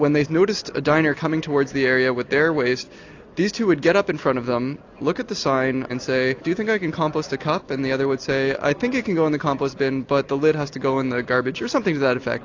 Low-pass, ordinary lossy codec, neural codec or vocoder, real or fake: 7.2 kHz; AAC, 48 kbps; none; real